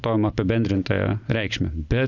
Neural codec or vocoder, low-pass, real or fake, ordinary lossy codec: none; 7.2 kHz; real; Opus, 64 kbps